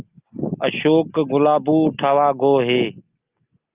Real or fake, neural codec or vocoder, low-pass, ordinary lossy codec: real; none; 3.6 kHz; Opus, 24 kbps